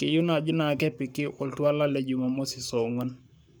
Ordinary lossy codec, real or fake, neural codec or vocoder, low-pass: none; fake; codec, 44.1 kHz, 7.8 kbps, Pupu-Codec; none